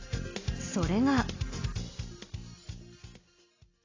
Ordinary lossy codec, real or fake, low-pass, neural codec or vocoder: AAC, 32 kbps; real; 7.2 kHz; none